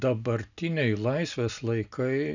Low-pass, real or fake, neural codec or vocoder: 7.2 kHz; real; none